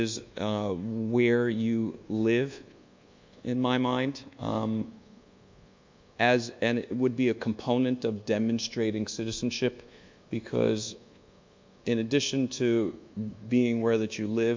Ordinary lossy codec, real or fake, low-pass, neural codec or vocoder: MP3, 64 kbps; fake; 7.2 kHz; codec, 24 kHz, 1.2 kbps, DualCodec